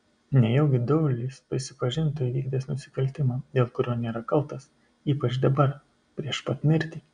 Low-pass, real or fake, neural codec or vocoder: 9.9 kHz; real; none